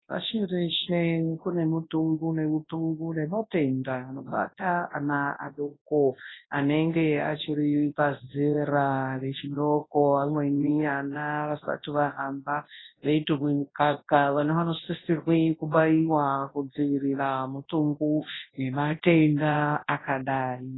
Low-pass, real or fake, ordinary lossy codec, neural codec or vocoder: 7.2 kHz; fake; AAC, 16 kbps; codec, 24 kHz, 0.9 kbps, WavTokenizer, large speech release